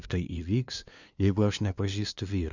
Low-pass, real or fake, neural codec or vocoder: 7.2 kHz; fake; codec, 16 kHz, 2 kbps, FunCodec, trained on LibriTTS, 25 frames a second